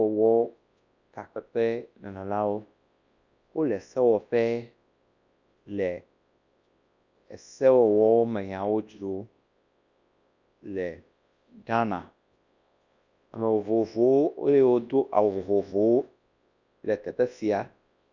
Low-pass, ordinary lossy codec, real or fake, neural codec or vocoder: 7.2 kHz; Opus, 64 kbps; fake; codec, 24 kHz, 0.9 kbps, WavTokenizer, large speech release